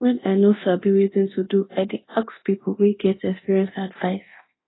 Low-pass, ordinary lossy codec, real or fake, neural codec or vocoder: 7.2 kHz; AAC, 16 kbps; fake; codec, 24 kHz, 0.9 kbps, DualCodec